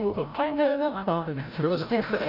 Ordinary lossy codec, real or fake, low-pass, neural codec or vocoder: none; fake; 5.4 kHz; codec, 16 kHz, 0.5 kbps, FreqCodec, larger model